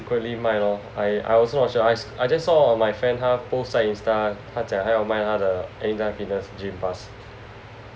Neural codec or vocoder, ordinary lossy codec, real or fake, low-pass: none; none; real; none